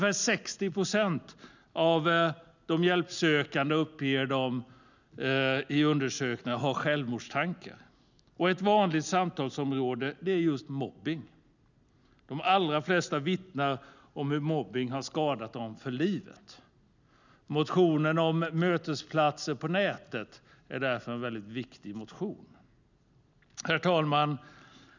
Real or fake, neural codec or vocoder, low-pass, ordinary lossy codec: real; none; 7.2 kHz; none